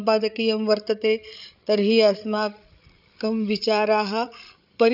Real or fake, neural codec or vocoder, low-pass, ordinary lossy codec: fake; codec, 16 kHz, 8 kbps, FreqCodec, larger model; 5.4 kHz; none